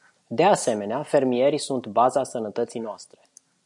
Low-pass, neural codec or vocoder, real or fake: 10.8 kHz; none; real